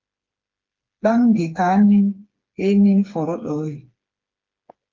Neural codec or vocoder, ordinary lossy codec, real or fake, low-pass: codec, 16 kHz, 4 kbps, FreqCodec, smaller model; Opus, 32 kbps; fake; 7.2 kHz